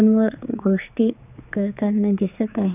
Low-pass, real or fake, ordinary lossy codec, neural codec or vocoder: 3.6 kHz; fake; none; codec, 16 kHz, 4 kbps, X-Codec, HuBERT features, trained on general audio